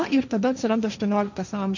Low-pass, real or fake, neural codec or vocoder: 7.2 kHz; fake; codec, 16 kHz, 1.1 kbps, Voila-Tokenizer